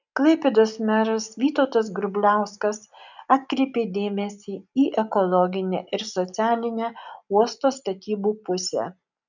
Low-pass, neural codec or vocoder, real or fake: 7.2 kHz; none; real